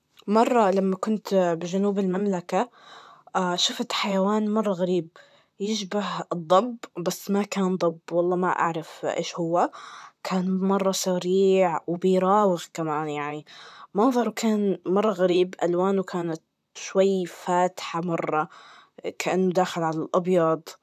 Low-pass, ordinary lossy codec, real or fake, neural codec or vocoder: 14.4 kHz; none; fake; vocoder, 44.1 kHz, 128 mel bands, Pupu-Vocoder